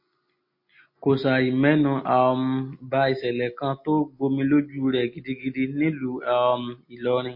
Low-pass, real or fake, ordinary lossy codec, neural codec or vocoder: 5.4 kHz; real; MP3, 32 kbps; none